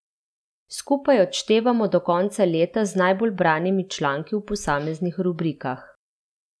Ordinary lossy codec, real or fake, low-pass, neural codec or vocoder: none; real; none; none